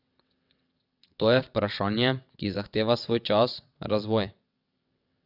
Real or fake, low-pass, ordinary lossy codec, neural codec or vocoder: fake; 5.4 kHz; none; vocoder, 22.05 kHz, 80 mel bands, WaveNeXt